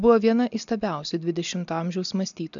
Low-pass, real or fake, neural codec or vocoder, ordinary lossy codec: 7.2 kHz; real; none; MP3, 96 kbps